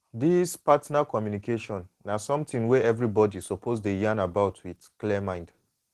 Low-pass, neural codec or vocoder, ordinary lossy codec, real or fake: 14.4 kHz; none; Opus, 16 kbps; real